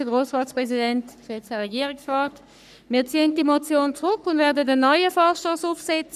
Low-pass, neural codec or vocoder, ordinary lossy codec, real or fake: 14.4 kHz; codec, 44.1 kHz, 3.4 kbps, Pupu-Codec; none; fake